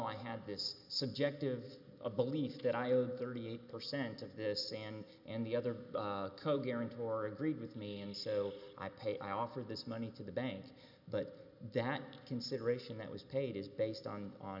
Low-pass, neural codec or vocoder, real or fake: 5.4 kHz; none; real